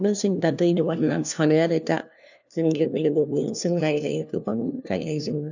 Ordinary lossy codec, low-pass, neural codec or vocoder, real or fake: none; 7.2 kHz; codec, 16 kHz, 1 kbps, FunCodec, trained on LibriTTS, 50 frames a second; fake